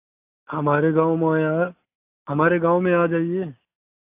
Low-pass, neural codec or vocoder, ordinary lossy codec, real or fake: 3.6 kHz; none; AAC, 24 kbps; real